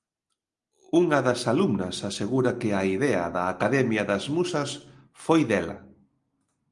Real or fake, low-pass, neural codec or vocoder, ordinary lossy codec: real; 10.8 kHz; none; Opus, 32 kbps